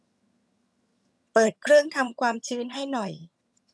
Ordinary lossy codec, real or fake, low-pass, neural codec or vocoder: none; fake; none; vocoder, 22.05 kHz, 80 mel bands, HiFi-GAN